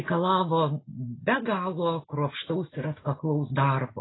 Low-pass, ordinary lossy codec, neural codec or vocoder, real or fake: 7.2 kHz; AAC, 16 kbps; none; real